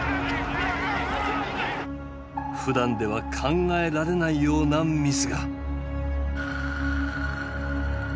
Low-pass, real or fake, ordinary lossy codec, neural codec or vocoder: none; real; none; none